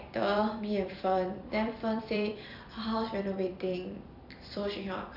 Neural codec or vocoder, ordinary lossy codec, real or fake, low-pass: vocoder, 44.1 kHz, 128 mel bands every 256 samples, BigVGAN v2; none; fake; 5.4 kHz